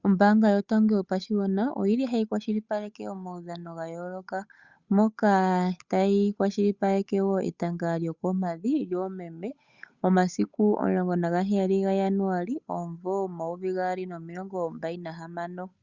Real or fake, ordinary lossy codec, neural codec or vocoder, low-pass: fake; Opus, 64 kbps; codec, 16 kHz, 8 kbps, FunCodec, trained on Chinese and English, 25 frames a second; 7.2 kHz